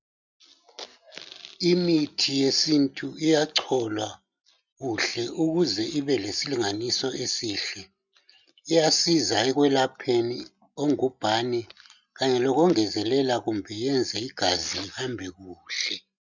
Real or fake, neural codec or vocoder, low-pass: real; none; 7.2 kHz